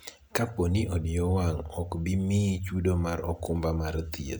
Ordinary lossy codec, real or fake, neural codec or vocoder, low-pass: none; real; none; none